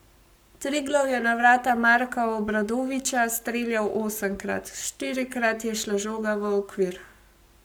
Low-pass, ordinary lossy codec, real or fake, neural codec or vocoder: none; none; fake; codec, 44.1 kHz, 7.8 kbps, Pupu-Codec